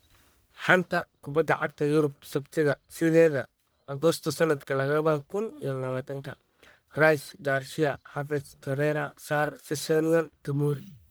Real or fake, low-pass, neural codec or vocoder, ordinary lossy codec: fake; none; codec, 44.1 kHz, 1.7 kbps, Pupu-Codec; none